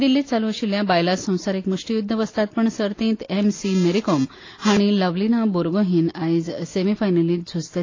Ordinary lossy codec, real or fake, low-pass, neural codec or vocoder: AAC, 32 kbps; real; 7.2 kHz; none